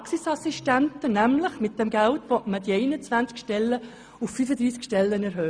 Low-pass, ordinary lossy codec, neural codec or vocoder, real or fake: 9.9 kHz; Opus, 64 kbps; none; real